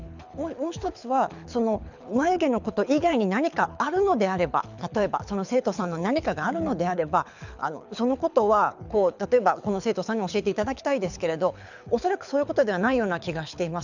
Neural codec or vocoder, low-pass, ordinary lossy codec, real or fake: codec, 24 kHz, 6 kbps, HILCodec; 7.2 kHz; none; fake